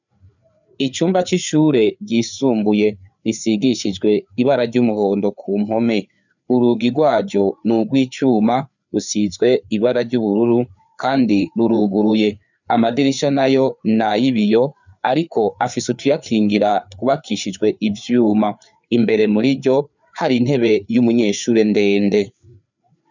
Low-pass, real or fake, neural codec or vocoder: 7.2 kHz; fake; codec, 16 kHz, 4 kbps, FreqCodec, larger model